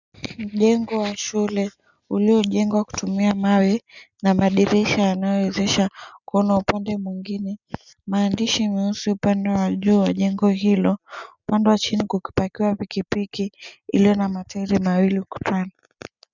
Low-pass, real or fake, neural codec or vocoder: 7.2 kHz; real; none